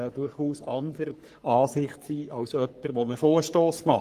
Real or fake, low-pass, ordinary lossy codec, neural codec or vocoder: fake; 14.4 kHz; Opus, 24 kbps; codec, 44.1 kHz, 3.4 kbps, Pupu-Codec